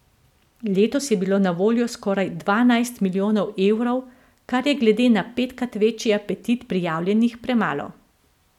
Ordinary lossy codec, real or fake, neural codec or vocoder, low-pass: none; real; none; 19.8 kHz